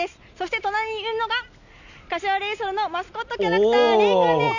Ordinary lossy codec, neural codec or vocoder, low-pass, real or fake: none; none; 7.2 kHz; real